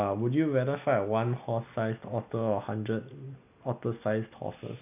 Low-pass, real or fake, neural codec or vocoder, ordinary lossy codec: 3.6 kHz; real; none; none